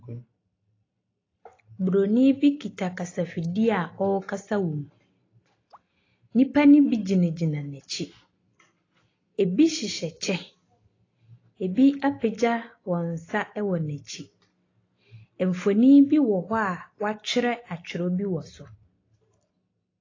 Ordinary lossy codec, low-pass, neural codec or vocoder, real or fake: AAC, 32 kbps; 7.2 kHz; none; real